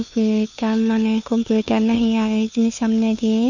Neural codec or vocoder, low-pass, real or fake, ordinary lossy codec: codec, 16 kHz, 2 kbps, FunCodec, trained on Chinese and English, 25 frames a second; 7.2 kHz; fake; none